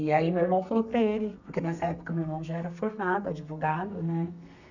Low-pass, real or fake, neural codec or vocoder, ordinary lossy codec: 7.2 kHz; fake; codec, 32 kHz, 1.9 kbps, SNAC; none